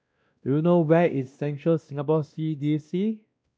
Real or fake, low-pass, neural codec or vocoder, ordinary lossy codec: fake; none; codec, 16 kHz, 1 kbps, X-Codec, WavLM features, trained on Multilingual LibriSpeech; none